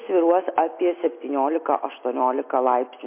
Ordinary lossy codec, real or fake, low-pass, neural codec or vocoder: MP3, 24 kbps; real; 3.6 kHz; none